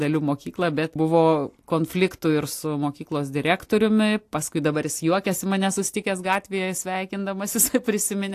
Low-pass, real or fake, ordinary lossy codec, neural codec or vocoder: 14.4 kHz; real; AAC, 64 kbps; none